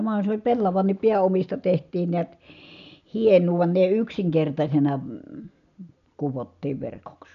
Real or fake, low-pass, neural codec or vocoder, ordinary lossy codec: real; 7.2 kHz; none; none